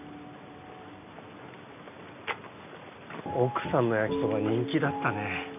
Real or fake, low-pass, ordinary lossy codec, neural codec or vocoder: real; 3.6 kHz; none; none